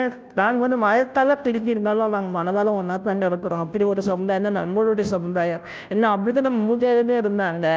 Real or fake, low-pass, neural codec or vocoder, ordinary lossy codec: fake; none; codec, 16 kHz, 0.5 kbps, FunCodec, trained on Chinese and English, 25 frames a second; none